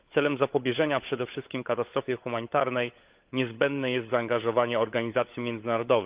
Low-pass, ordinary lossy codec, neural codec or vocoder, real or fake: 3.6 kHz; Opus, 32 kbps; codec, 16 kHz, 16 kbps, FunCodec, trained on LibriTTS, 50 frames a second; fake